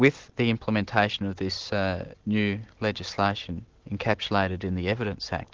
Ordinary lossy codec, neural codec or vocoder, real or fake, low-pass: Opus, 16 kbps; none; real; 7.2 kHz